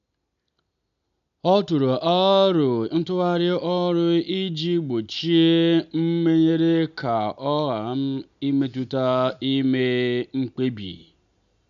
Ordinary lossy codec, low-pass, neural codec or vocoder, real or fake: none; 7.2 kHz; none; real